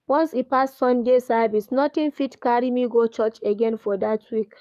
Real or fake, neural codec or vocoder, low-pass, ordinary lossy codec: fake; codec, 44.1 kHz, 7.8 kbps, DAC; 14.4 kHz; none